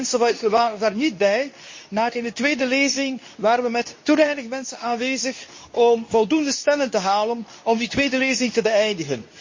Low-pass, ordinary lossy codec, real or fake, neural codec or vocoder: 7.2 kHz; MP3, 32 kbps; fake; codec, 24 kHz, 0.9 kbps, WavTokenizer, medium speech release version 2